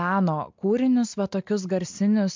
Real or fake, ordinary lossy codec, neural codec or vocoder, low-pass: real; MP3, 64 kbps; none; 7.2 kHz